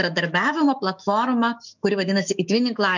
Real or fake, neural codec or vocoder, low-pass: fake; autoencoder, 48 kHz, 128 numbers a frame, DAC-VAE, trained on Japanese speech; 7.2 kHz